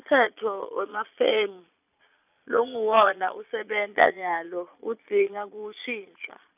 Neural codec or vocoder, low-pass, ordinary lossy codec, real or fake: vocoder, 22.05 kHz, 80 mel bands, Vocos; 3.6 kHz; none; fake